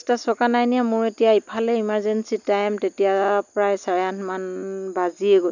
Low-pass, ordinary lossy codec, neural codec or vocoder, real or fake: 7.2 kHz; none; none; real